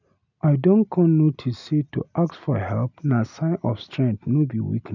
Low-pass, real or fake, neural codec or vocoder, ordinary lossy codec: 7.2 kHz; real; none; none